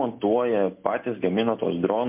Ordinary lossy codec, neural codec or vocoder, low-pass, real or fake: MP3, 32 kbps; none; 3.6 kHz; real